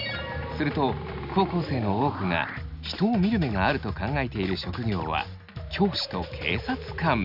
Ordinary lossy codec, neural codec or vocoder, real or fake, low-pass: none; none; real; 5.4 kHz